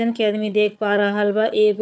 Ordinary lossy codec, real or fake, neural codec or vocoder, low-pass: none; fake; codec, 16 kHz, 4 kbps, FunCodec, trained on Chinese and English, 50 frames a second; none